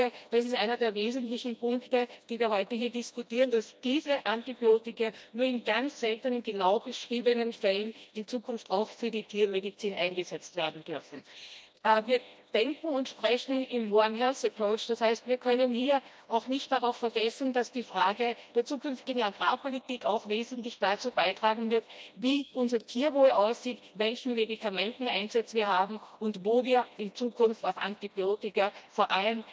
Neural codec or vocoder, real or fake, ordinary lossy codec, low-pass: codec, 16 kHz, 1 kbps, FreqCodec, smaller model; fake; none; none